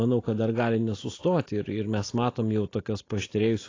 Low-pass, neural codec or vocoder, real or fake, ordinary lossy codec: 7.2 kHz; none; real; AAC, 32 kbps